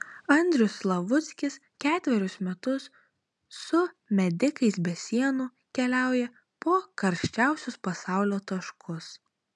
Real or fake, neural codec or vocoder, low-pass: real; none; 10.8 kHz